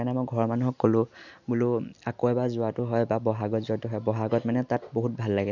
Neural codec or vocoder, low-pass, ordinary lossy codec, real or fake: none; 7.2 kHz; none; real